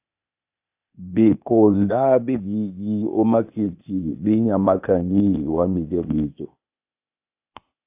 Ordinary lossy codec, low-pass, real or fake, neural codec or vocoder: AAC, 32 kbps; 3.6 kHz; fake; codec, 16 kHz, 0.8 kbps, ZipCodec